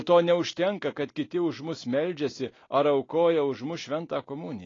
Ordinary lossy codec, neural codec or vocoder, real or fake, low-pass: AAC, 32 kbps; none; real; 7.2 kHz